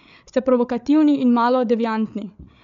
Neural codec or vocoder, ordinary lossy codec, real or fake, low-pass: codec, 16 kHz, 8 kbps, FreqCodec, larger model; none; fake; 7.2 kHz